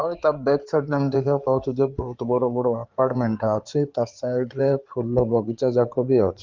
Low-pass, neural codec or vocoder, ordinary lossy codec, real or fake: 7.2 kHz; codec, 16 kHz in and 24 kHz out, 2.2 kbps, FireRedTTS-2 codec; Opus, 32 kbps; fake